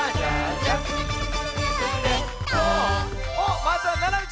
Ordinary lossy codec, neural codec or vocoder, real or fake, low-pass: none; none; real; none